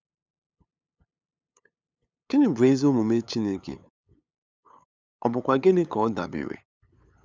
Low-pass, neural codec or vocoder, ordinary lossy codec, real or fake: none; codec, 16 kHz, 8 kbps, FunCodec, trained on LibriTTS, 25 frames a second; none; fake